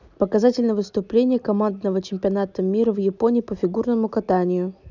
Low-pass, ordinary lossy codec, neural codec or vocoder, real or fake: 7.2 kHz; none; none; real